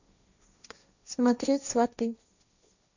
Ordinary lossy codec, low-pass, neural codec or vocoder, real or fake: none; 7.2 kHz; codec, 16 kHz, 1.1 kbps, Voila-Tokenizer; fake